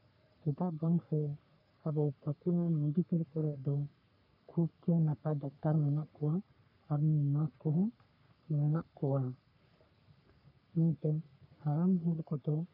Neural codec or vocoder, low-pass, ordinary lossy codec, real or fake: codec, 44.1 kHz, 3.4 kbps, Pupu-Codec; 5.4 kHz; none; fake